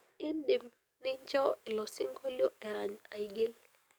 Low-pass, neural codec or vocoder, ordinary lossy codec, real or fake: none; codec, 44.1 kHz, 7.8 kbps, DAC; none; fake